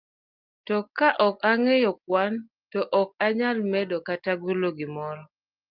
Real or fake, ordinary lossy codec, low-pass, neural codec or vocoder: real; Opus, 32 kbps; 5.4 kHz; none